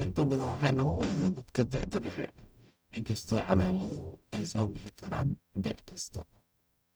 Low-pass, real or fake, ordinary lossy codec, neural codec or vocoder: none; fake; none; codec, 44.1 kHz, 0.9 kbps, DAC